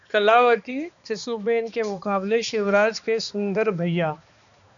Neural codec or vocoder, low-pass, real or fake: codec, 16 kHz, 2 kbps, X-Codec, HuBERT features, trained on balanced general audio; 7.2 kHz; fake